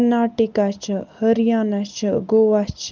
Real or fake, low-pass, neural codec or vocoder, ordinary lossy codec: real; 7.2 kHz; none; Opus, 24 kbps